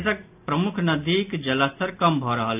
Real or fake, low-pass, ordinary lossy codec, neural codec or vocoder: real; 3.6 kHz; AAC, 32 kbps; none